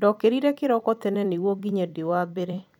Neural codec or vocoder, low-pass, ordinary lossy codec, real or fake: vocoder, 44.1 kHz, 128 mel bands every 256 samples, BigVGAN v2; 19.8 kHz; none; fake